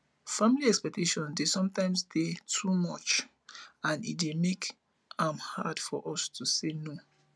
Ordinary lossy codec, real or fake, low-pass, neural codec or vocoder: none; real; none; none